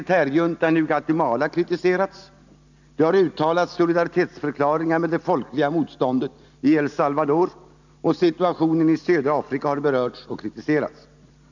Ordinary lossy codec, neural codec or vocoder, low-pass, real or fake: none; none; 7.2 kHz; real